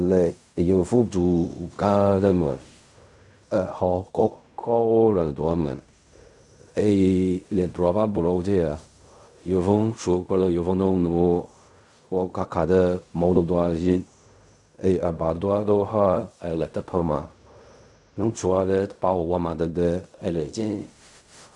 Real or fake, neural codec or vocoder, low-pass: fake; codec, 16 kHz in and 24 kHz out, 0.4 kbps, LongCat-Audio-Codec, fine tuned four codebook decoder; 10.8 kHz